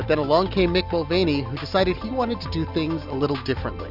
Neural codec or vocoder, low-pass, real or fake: none; 5.4 kHz; real